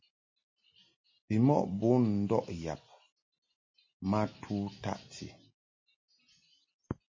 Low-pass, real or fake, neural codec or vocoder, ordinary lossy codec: 7.2 kHz; real; none; MP3, 32 kbps